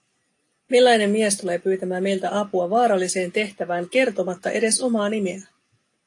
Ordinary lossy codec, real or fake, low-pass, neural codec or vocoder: AAC, 48 kbps; real; 10.8 kHz; none